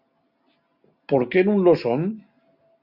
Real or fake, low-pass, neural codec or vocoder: real; 5.4 kHz; none